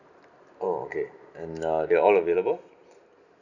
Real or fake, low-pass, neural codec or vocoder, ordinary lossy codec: real; 7.2 kHz; none; none